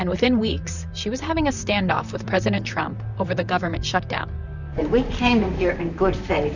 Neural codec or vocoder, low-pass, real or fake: vocoder, 44.1 kHz, 128 mel bands, Pupu-Vocoder; 7.2 kHz; fake